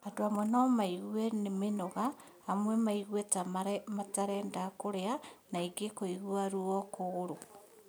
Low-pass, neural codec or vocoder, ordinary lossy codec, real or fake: none; none; none; real